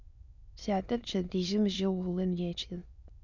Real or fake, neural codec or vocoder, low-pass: fake; autoencoder, 22.05 kHz, a latent of 192 numbers a frame, VITS, trained on many speakers; 7.2 kHz